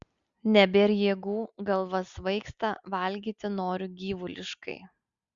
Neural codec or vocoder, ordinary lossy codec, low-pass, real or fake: none; Opus, 64 kbps; 7.2 kHz; real